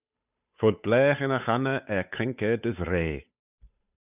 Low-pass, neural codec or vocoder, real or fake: 3.6 kHz; codec, 16 kHz, 8 kbps, FunCodec, trained on Chinese and English, 25 frames a second; fake